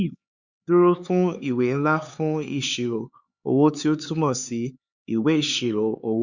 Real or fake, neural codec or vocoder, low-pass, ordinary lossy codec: fake; codec, 16 kHz, 4 kbps, X-Codec, HuBERT features, trained on LibriSpeech; 7.2 kHz; Opus, 64 kbps